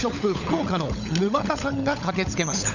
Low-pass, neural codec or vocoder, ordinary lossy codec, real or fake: 7.2 kHz; codec, 16 kHz, 16 kbps, FunCodec, trained on LibriTTS, 50 frames a second; none; fake